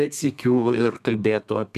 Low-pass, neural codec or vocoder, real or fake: 14.4 kHz; codec, 32 kHz, 1.9 kbps, SNAC; fake